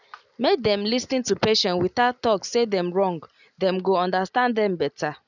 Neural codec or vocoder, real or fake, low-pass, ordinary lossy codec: none; real; 7.2 kHz; none